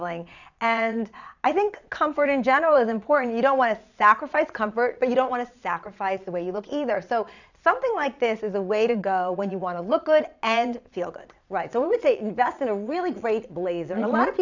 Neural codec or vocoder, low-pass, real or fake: vocoder, 22.05 kHz, 80 mel bands, Vocos; 7.2 kHz; fake